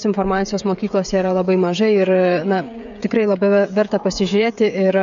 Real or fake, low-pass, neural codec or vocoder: fake; 7.2 kHz; codec, 16 kHz, 16 kbps, FreqCodec, smaller model